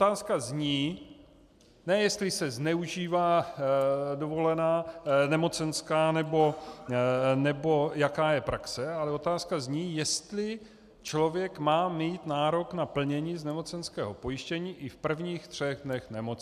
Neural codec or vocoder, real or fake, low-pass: none; real; 14.4 kHz